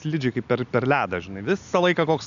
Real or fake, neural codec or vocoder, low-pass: real; none; 7.2 kHz